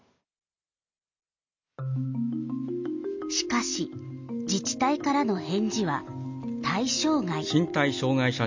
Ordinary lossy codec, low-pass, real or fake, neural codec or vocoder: MP3, 64 kbps; 7.2 kHz; real; none